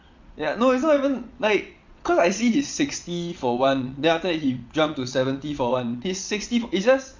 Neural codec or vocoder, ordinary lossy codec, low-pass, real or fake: vocoder, 22.05 kHz, 80 mel bands, WaveNeXt; MP3, 64 kbps; 7.2 kHz; fake